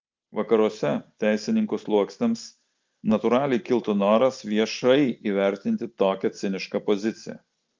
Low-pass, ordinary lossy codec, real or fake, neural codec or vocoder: 7.2 kHz; Opus, 24 kbps; real; none